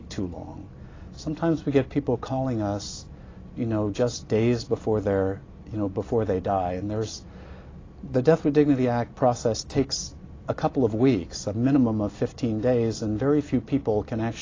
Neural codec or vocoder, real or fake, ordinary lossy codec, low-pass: none; real; AAC, 32 kbps; 7.2 kHz